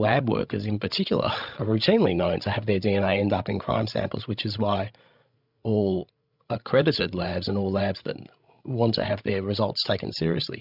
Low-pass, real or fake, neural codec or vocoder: 5.4 kHz; fake; codec, 16 kHz, 16 kbps, FreqCodec, larger model